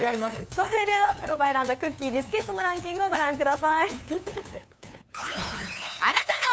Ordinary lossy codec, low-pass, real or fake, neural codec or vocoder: none; none; fake; codec, 16 kHz, 2 kbps, FunCodec, trained on LibriTTS, 25 frames a second